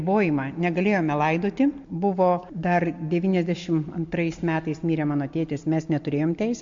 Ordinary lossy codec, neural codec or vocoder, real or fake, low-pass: MP3, 48 kbps; none; real; 7.2 kHz